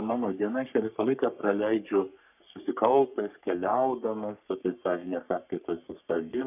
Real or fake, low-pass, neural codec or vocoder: fake; 3.6 kHz; codec, 44.1 kHz, 3.4 kbps, Pupu-Codec